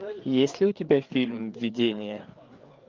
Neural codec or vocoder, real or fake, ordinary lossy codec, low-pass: codec, 16 kHz, 4 kbps, FreqCodec, larger model; fake; Opus, 24 kbps; 7.2 kHz